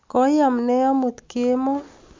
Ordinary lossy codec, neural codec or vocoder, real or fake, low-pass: MP3, 48 kbps; none; real; 7.2 kHz